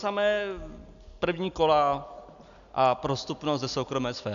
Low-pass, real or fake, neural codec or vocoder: 7.2 kHz; real; none